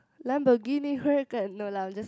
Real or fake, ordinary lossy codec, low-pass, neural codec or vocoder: real; none; none; none